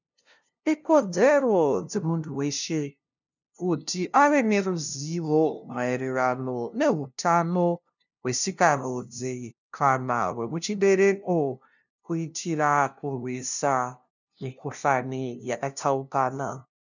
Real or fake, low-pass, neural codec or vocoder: fake; 7.2 kHz; codec, 16 kHz, 0.5 kbps, FunCodec, trained on LibriTTS, 25 frames a second